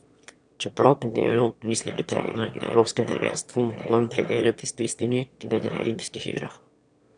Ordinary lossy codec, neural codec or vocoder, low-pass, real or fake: none; autoencoder, 22.05 kHz, a latent of 192 numbers a frame, VITS, trained on one speaker; 9.9 kHz; fake